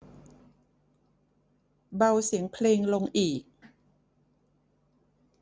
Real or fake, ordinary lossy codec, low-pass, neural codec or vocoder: real; none; none; none